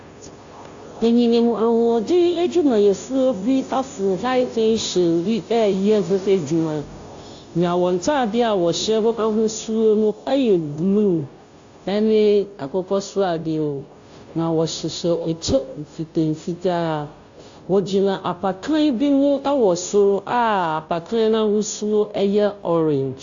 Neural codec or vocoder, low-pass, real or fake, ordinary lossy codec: codec, 16 kHz, 0.5 kbps, FunCodec, trained on Chinese and English, 25 frames a second; 7.2 kHz; fake; AAC, 64 kbps